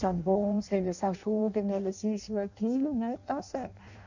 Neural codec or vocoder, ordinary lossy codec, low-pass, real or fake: codec, 16 kHz in and 24 kHz out, 0.6 kbps, FireRedTTS-2 codec; none; 7.2 kHz; fake